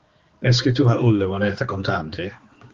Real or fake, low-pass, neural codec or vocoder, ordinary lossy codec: fake; 7.2 kHz; codec, 16 kHz, 2 kbps, X-Codec, HuBERT features, trained on balanced general audio; Opus, 24 kbps